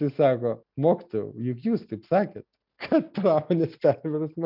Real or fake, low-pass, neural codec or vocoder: real; 5.4 kHz; none